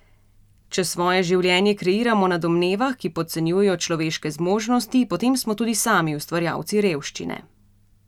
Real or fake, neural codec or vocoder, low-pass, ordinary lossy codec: real; none; 19.8 kHz; none